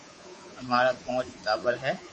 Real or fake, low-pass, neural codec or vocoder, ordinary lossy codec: fake; 10.8 kHz; codec, 24 kHz, 3.1 kbps, DualCodec; MP3, 32 kbps